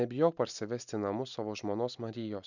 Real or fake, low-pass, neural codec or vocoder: real; 7.2 kHz; none